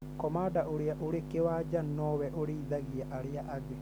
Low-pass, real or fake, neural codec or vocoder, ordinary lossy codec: none; real; none; none